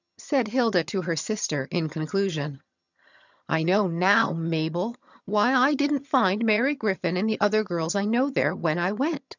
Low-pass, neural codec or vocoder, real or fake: 7.2 kHz; vocoder, 22.05 kHz, 80 mel bands, HiFi-GAN; fake